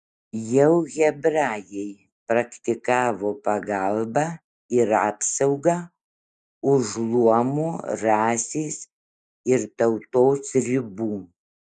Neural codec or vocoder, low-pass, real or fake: none; 9.9 kHz; real